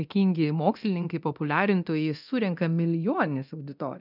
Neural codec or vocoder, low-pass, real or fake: codec, 24 kHz, 0.9 kbps, DualCodec; 5.4 kHz; fake